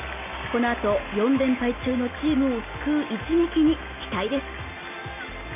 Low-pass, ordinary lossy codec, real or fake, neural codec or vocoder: 3.6 kHz; none; real; none